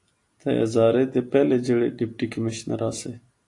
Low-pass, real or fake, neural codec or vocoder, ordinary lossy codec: 10.8 kHz; real; none; AAC, 48 kbps